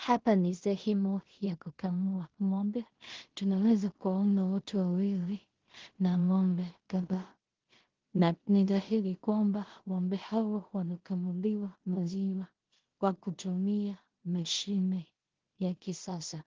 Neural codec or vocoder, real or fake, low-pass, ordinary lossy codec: codec, 16 kHz in and 24 kHz out, 0.4 kbps, LongCat-Audio-Codec, two codebook decoder; fake; 7.2 kHz; Opus, 16 kbps